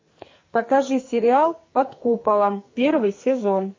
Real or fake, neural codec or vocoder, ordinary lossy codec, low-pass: fake; codec, 44.1 kHz, 2.6 kbps, SNAC; MP3, 32 kbps; 7.2 kHz